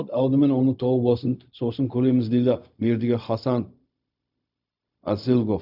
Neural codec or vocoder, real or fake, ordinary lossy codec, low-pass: codec, 16 kHz, 0.4 kbps, LongCat-Audio-Codec; fake; none; 5.4 kHz